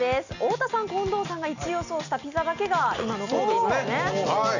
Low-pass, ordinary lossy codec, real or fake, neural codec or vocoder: 7.2 kHz; none; real; none